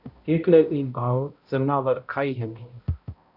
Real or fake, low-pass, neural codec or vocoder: fake; 5.4 kHz; codec, 16 kHz, 0.5 kbps, X-Codec, HuBERT features, trained on balanced general audio